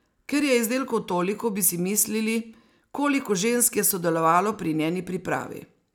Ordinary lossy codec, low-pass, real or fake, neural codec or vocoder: none; none; real; none